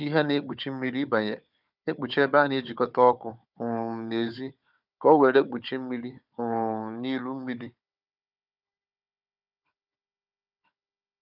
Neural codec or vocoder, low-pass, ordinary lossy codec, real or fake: codec, 16 kHz, 4 kbps, FunCodec, trained on Chinese and English, 50 frames a second; 5.4 kHz; none; fake